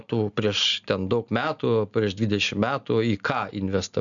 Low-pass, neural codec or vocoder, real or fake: 7.2 kHz; none; real